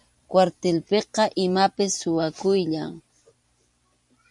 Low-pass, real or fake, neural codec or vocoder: 10.8 kHz; fake; vocoder, 44.1 kHz, 128 mel bands every 512 samples, BigVGAN v2